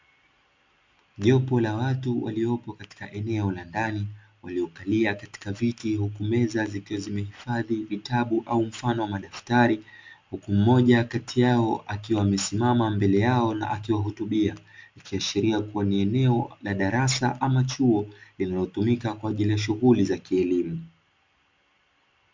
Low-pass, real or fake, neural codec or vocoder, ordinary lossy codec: 7.2 kHz; real; none; MP3, 64 kbps